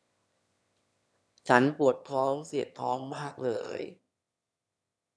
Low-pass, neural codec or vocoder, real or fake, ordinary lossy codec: none; autoencoder, 22.05 kHz, a latent of 192 numbers a frame, VITS, trained on one speaker; fake; none